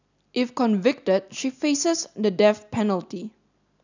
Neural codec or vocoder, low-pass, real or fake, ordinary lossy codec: none; 7.2 kHz; real; none